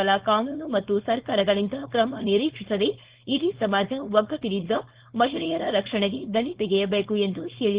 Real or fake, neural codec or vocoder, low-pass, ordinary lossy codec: fake; codec, 16 kHz, 4.8 kbps, FACodec; 3.6 kHz; Opus, 16 kbps